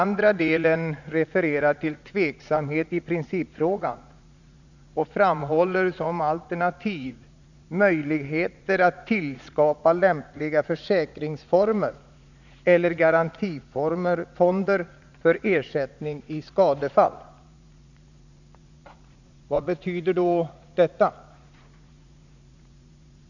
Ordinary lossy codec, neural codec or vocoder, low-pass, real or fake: none; vocoder, 44.1 kHz, 80 mel bands, Vocos; 7.2 kHz; fake